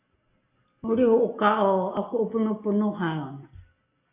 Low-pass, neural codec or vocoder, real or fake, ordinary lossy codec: 3.6 kHz; none; real; AAC, 24 kbps